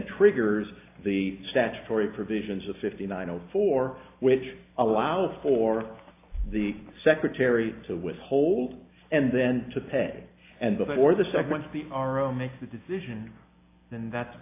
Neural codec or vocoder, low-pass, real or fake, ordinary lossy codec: none; 3.6 kHz; real; AAC, 24 kbps